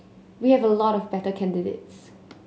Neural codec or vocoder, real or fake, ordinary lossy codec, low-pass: none; real; none; none